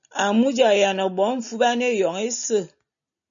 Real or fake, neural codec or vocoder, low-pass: real; none; 7.2 kHz